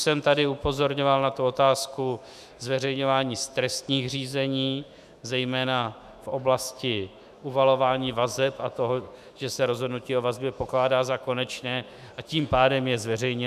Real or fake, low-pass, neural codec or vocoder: fake; 14.4 kHz; autoencoder, 48 kHz, 128 numbers a frame, DAC-VAE, trained on Japanese speech